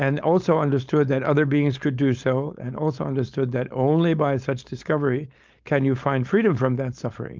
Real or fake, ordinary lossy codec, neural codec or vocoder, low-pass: fake; Opus, 24 kbps; codec, 16 kHz, 4.8 kbps, FACodec; 7.2 kHz